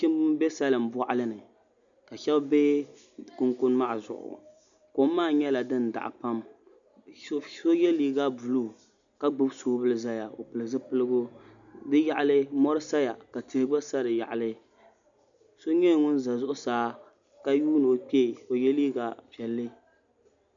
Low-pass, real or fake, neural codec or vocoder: 7.2 kHz; real; none